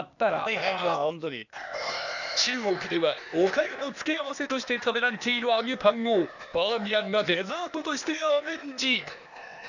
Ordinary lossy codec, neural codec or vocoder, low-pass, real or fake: none; codec, 16 kHz, 0.8 kbps, ZipCodec; 7.2 kHz; fake